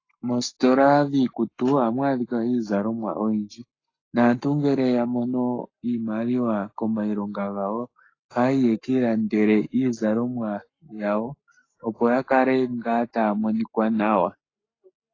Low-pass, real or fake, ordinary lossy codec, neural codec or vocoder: 7.2 kHz; fake; AAC, 32 kbps; codec, 44.1 kHz, 7.8 kbps, Pupu-Codec